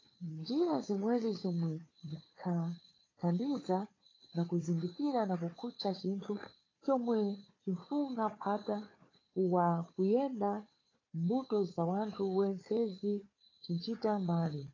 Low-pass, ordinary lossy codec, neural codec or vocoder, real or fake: 7.2 kHz; AAC, 32 kbps; codec, 16 kHz, 4 kbps, FunCodec, trained on Chinese and English, 50 frames a second; fake